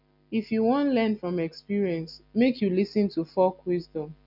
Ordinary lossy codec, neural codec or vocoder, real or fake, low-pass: none; none; real; 5.4 kHz